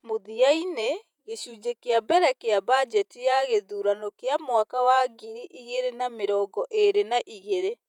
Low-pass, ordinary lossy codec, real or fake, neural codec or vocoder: 19.8 kHz; none; fake; vocoder, 48 kHz, 128 mel bands, Vocos